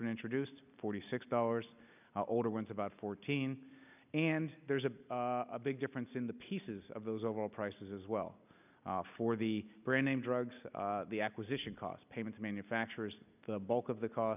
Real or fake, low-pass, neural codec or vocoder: real; 3.6 kHz; none